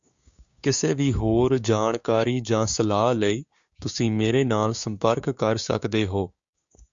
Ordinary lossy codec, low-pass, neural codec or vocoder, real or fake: Opus, 64 kbps; 7.2 kHz; codec, 16 kHz, 6 kbps, DAC; fake